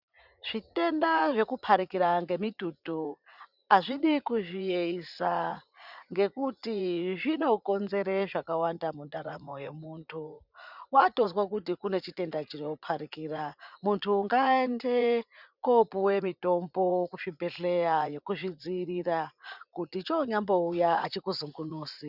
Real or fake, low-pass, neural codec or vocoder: fake; 5.4 kHz; vocoder, 44.1 kHz, 80 mel bands, Vocos